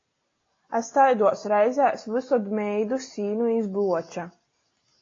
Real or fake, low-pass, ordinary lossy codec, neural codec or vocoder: real; 7.2 kHz; AAC, 32 kbps; none